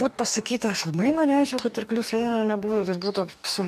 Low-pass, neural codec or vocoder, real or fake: 14.4 kHz; codec, 44.1 kHz, 2.6 kbps, DAC; fake